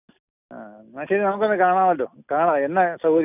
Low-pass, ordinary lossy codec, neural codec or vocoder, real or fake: 3.6 kHz; none; none; real